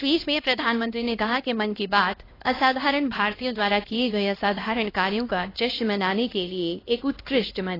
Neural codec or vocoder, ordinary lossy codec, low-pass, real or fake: codec, 16 kHz, 1 kbps, X-Codec, HuBERT features, trained on LibriSpeech; AAC, 24 kbps; 5.4 kHz; fake